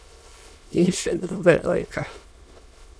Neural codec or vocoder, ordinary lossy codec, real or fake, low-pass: autoencoder, 22.05 kHz, a latent of 192 numbers a frame, VITS, trained on many speakers; none; fake; none